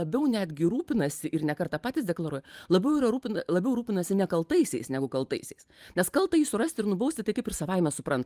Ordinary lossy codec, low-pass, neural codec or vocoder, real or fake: Opus, 32 kbps; 14.4 kHz; vocoder, 44.1 kHz, 128 mel bands every 512 samples, BigVGAN v2; fake